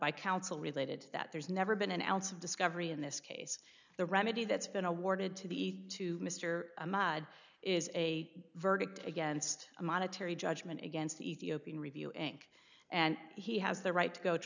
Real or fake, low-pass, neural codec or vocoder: real; 7.2 kHz; none